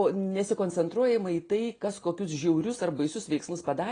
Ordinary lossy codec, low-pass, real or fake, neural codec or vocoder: AAC, 32 kbps; 9.9 kHz; real; none